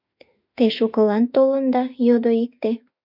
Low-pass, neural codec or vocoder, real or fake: 5.4 kHz; autoencoder, 48 kHz, 32 numbers a frame, DAC-VAE, trained on Japanese speech; fake